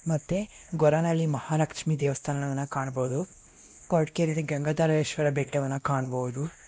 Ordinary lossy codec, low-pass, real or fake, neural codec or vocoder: none; none; fake; codec, 16 kHz, 1 kbps, X-Codec, WavLM features, trained on Multilingual LibriSpeech